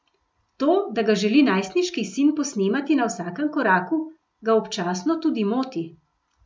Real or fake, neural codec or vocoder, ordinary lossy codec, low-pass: real; none; none; none